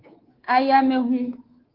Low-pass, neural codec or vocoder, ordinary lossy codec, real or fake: 5.4 kHz; codec, 24 kHz, 3.1 kbps, DualCodec; Opus, 16 kbps; fake